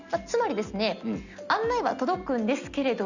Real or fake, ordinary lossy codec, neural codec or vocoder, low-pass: real; Opus, 64 kbps; none; 7.2 kHz